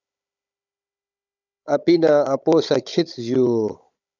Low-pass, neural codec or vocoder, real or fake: 7.2 kHz; codec, 16 kHz, 16 kbps, FunCodec, trained on Chinese and English, 50 frames a second; fake